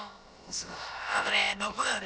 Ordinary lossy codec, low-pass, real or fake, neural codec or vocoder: none; none; fake; codec, 16 kHz, about 1 kbps, DyCAST, with the encoder's durations